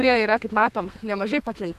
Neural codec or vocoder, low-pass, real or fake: codec, 32 kHz, 1.9 kbps, SNAC; 14.4 kHz; fake